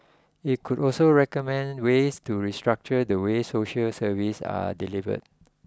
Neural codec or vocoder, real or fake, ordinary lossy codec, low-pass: none; real; none; none